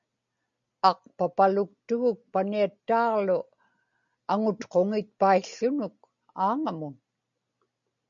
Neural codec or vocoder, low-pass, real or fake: none; 7.2 kHz; real